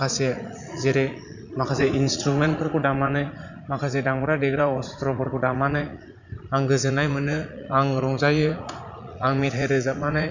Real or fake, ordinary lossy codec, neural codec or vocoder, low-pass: fake; none; vocoder, 44.1 kHz, 80 mel bands, Vocos; 7.2 kHz